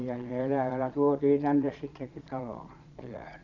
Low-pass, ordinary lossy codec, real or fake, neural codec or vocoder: 7.2 kHz; none; fake; vocoder, 22.05 kHz, 80 mel bands, Vocos